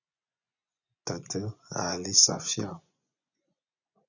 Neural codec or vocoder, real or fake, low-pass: vocoder, 24 kHz, 100 mel bands, Vocos; fake; 7.2 kHz